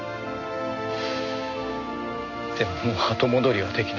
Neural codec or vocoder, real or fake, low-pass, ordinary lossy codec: none; real; 7.2 kHz; none